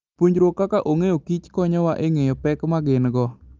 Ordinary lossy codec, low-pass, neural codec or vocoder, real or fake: Opus, 32 kbps; 7.2 kHz; none; real